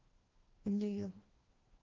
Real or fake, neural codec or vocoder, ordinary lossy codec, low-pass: fake; codec, 16 kHz, 1 kbps, FreqCodec, larger model; Opus, 16 kbps; 7.2 kHz